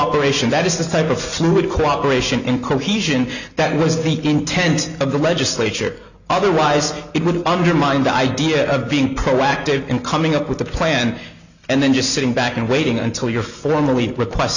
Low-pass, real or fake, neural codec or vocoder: 7.2 kHz; real; none